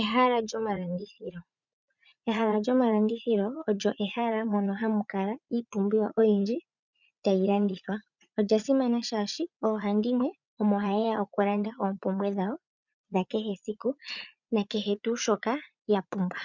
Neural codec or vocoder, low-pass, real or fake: vocoder, 44.1 kHz, 80 mel bands, Vocos; 7.2 kHz; fake